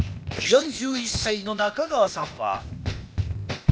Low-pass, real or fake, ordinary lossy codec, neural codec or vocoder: none; fake; none; codec, 16 kHz, 0.8 kbps, ZipCodec